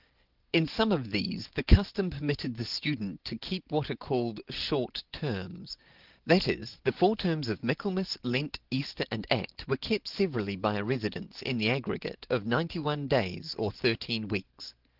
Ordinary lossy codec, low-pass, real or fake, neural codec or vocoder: Opus, 16 kbps; 5.4 kHz; real; none